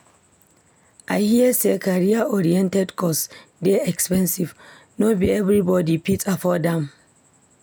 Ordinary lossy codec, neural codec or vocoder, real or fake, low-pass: none; none; real; none